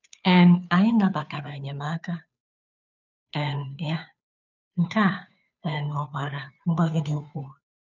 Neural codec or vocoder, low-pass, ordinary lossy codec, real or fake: codec, 16 kHz, 2 kbps, FunCodec, trained on Chinese and English, 25 frames a second; 7.2 kHz; none; fake